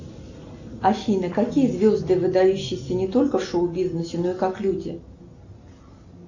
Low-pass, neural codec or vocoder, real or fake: 7.2 kHz; none; real